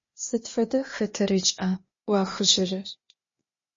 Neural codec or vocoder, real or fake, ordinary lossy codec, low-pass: codec, 16 kHz, 0.8 kbps, ZipCodec; fake; MP3, 32 kbps; 7.2 kHz